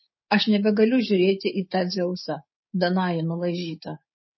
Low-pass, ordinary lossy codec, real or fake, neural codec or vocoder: 7.2 kHz; MP3, 24 kbps; fake; codec, 16 kHz, 6 kbps, DAC